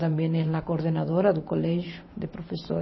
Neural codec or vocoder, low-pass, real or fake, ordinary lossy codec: vocoder, 44.1 kHz, 128 mel bands every 512 samples, BigVGAN v2; 7.2 kHz; fake; MP3, 24 kbps